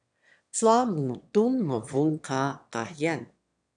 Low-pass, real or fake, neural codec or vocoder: 9.9 kHz; fake; autoencoder, 22.05 kHz, a latent of 192 numbers a frame, VITS, trained on one speaker